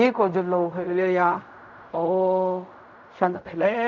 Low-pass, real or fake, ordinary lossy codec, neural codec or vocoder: 7.2 kHz; fake; none; codec, 16 kHz in and 24 kHz out, 0.4 kbps, LongCat-Audio-Codec, fine tuned four codebook decoder